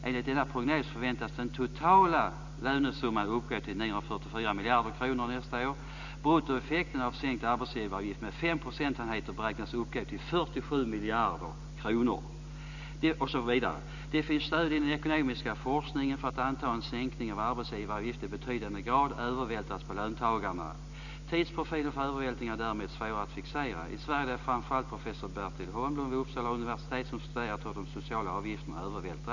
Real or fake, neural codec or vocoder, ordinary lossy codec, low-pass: real; none; none; 7.2 kHz